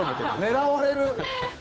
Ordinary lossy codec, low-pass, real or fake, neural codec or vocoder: none; none; fake; codec, 16 kHz, 8 kbps, FunCodec, trained on Chinese and English, 25 frames a second